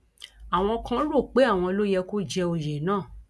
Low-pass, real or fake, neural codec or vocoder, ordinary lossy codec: none; real; none; none